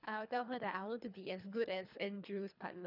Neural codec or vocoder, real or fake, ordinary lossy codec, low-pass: codec, 24 kHz, 3 kbps, HILCodec; fake; none; 5.4 kHz